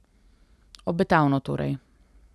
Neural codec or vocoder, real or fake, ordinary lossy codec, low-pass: none; real; none; none